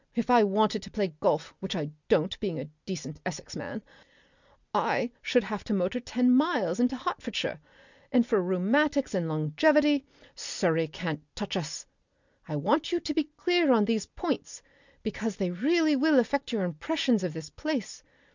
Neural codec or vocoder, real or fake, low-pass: none; real; 7.2 kHz